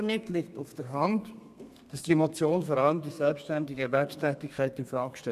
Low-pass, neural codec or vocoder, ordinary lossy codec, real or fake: 14.4 kHz; codec, 32 kHz, 1.9 kbps, SNAC; none; fake